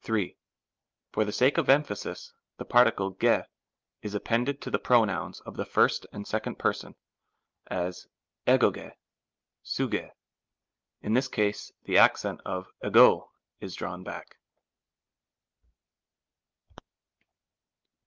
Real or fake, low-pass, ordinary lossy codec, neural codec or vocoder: real; 7.2 kHz; Opus, 24 kbps; none